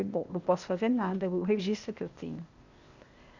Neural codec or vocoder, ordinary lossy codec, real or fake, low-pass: codec, 16 kHz, 0.8 kbps, ZipCodec; Opus, 64 kbps; fake; 7.2 kHz